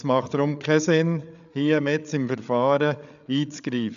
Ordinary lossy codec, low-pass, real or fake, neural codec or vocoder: none; 7.2 kHz; fake; codec, 16 kHz, 8 kbps, FreqCodec, larger model